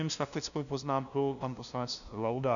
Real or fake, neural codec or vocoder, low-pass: fake; codec, 16 kHz, 0.5 kbps, FunCodec, trained on LibriTTS, 25 frames a second; 7.2 kHz